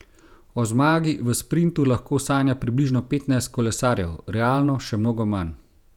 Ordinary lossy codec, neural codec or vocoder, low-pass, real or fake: none; vocoder, 48 kHz, 128 mel bands, Vocos; 19.8 kHz; fake